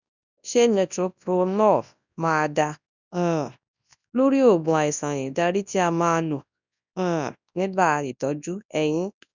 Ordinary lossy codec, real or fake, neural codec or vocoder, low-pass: none; fake; codec, 24 kHz, 0.9 kbps, WavTokenizer, large speech release; 7.2 kHz